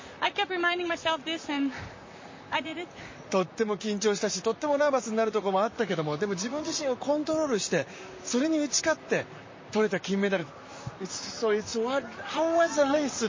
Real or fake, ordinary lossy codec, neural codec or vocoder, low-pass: fake; MP3, 32 kbps; vocoder, 44.1 kHz, 128 mel bands, Pupu-Vocoder; 7.2 kHz